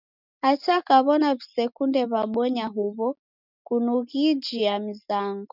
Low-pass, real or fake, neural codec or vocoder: 5.4 kHz; real; none